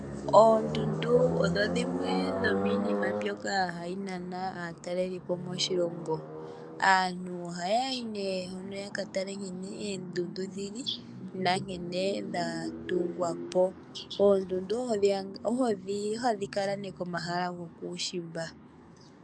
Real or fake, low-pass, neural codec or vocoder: fake; 9.9 kHz; codec, 44.1 kHz, 7.8 kbps, DAC